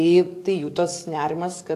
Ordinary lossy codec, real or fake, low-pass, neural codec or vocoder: AAC, 64 kbps; fake; 14.4 kHz; codec, 44.1 kHz, 7.8 kbps, DAC